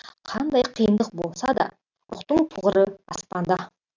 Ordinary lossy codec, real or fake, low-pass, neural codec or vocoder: none; real; 7.2 kHz; none